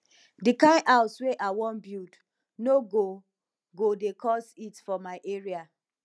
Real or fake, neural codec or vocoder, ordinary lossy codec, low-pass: real; none; none; none